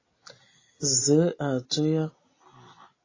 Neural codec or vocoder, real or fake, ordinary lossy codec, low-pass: none; real; AAC, 32 kbps; 7.2 kHz